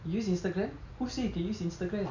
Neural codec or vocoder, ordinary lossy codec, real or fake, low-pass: none; none; real; 7.2 kHz